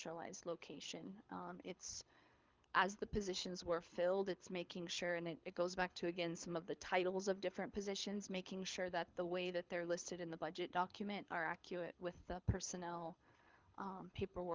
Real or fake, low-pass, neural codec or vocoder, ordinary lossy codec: fake; 7.2 kHz; codec, 24 kHz, 6 kbps, HILCodec; Opus, 32 kbps